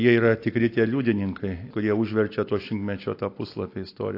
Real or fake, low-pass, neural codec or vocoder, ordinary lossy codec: real; 5.4 kHz; none; AAC, 32 kbps